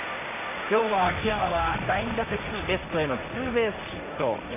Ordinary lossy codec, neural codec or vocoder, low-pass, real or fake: none; codec, 16 kHz, 1.1 kbps, Voila-Tokenizer; 3.6 kHz; fake